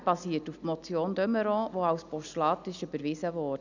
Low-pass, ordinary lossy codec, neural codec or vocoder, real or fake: 7.2 kHz; none; none; real